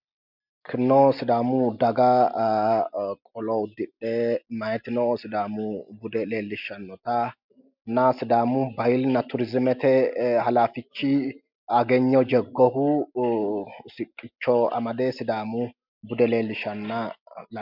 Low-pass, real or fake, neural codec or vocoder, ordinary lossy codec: 5.4 kHz; real; none; MP3, 48 kbps